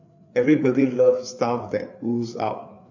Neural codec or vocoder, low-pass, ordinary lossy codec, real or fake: codec, 16 kHz, 4 kbps, FreqCodec, larger model; 7.2 kHz; AAC, 48 kbps; fake